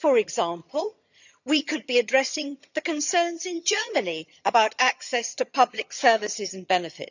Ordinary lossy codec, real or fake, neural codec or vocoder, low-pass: none; fake; vocoder, 22.05 kHz, 80 mel bands, HiFi-GAN; 7.2 kHz